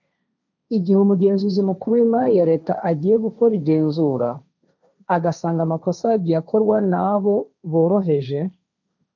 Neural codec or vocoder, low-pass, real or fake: codec, 16 kHz, 1.1 kbps, Voila-Tokenizer; 7.2 kHz; fake